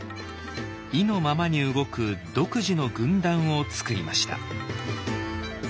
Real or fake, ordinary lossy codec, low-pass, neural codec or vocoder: real; none; none; none